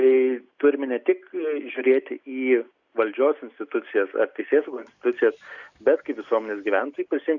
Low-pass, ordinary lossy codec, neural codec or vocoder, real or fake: 7.2 kHz; Opus, 64 kbps; none; real